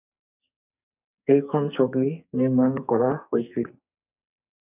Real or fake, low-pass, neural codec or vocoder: fake; 3.6 kHz; codec, 44.1 kHz, 2.6 kbps, SNAC